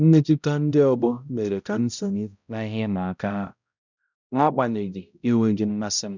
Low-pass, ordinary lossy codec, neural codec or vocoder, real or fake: 7.2 kHz; none; codec, 16 kHz, 0.5 kbps, X-Codec, HuBERT features, trained on balanced general audio; fake